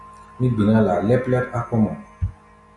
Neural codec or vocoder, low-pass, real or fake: none; 10.8 kHz; real